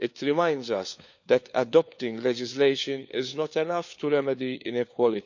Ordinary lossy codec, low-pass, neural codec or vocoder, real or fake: none; 7.2 kHz; codec, 16 kHz, 4 kbps, FunCodec, trained on LibriTTS, 50 frames a second; fake